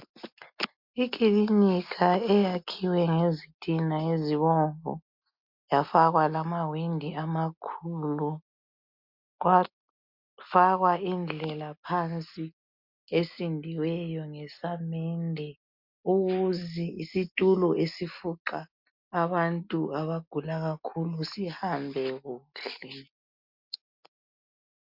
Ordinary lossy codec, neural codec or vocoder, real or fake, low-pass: MP3, 48 kbps; none; real; 5.4 kHz